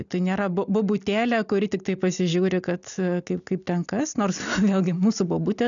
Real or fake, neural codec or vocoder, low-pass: real; none; 7.2 kHz